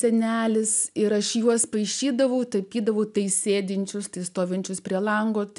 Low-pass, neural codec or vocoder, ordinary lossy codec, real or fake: 10.8 kHz; none; MP3, 96 kbps; real